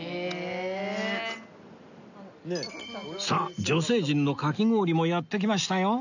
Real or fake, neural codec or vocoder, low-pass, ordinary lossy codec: real; none; 7.2 kHz; none